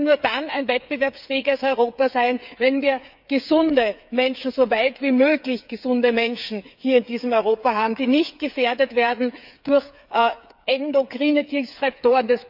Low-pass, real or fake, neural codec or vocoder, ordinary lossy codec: 5.4 kHz; fake; codec, 16 kHz, 8 kbps, FreqCodec, smaller model; none